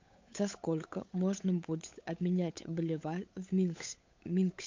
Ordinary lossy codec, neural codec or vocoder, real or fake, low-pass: MP3, 48 kbps; codec, 16 kHz, 8 kbps, FunCodec, trained on Chinese and English, 25 frames a second; fake; 7.2 kHz